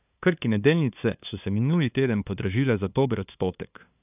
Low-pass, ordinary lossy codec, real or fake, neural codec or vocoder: 3.6 kHz; none; fake; codec, 16 kHz, 2 kbps, FunCodec, trained on LibriTTS, 25 frames a second